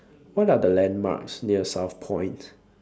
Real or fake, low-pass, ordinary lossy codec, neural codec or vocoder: real; none; none; none